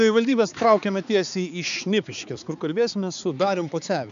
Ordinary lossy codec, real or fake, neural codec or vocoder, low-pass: MP3, 96 kbps; fake; codec, 16 kHz, 4 kbps, X-Codec, HuBERT features, trained on balanced general audio; 7.2 kHz